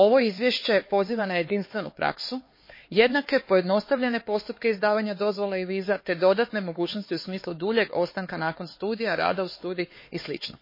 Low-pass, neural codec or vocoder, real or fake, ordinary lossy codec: 5.4 kHz; codec, 16 kHz, 4 kbps, X-Codec, HuBERT features, trained on LibriSpeech; fake; MP3, 24 kbps